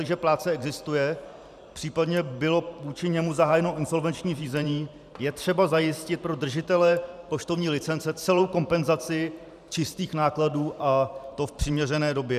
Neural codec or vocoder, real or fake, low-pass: vocoder, 44.1 kHz, 128 mel bands every 512 samples, BigVGAN v2; fake; 14.4 kHz